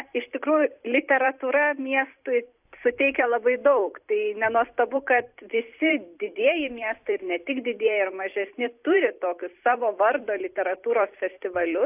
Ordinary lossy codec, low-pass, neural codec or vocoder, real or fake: AAC, 32 kbps; 3.6 kHz; vocoder, 44.1 kHz, 128 mel bands every 256 samples, BigVGAN v2; fake